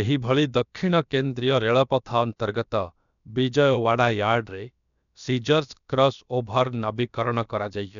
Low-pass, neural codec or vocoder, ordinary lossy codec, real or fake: 7.2 kHz; codec, 16 kHz, about 1 kbps, DyCAST, with the encoder's durations; none; fake